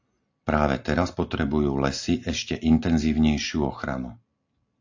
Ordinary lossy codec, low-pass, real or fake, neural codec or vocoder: AAC, 48 kbps; 7.2 kHz; real; none